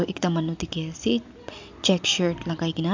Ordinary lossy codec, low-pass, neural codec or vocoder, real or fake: MP3, 64 kbps; 7.2 kHz; none; real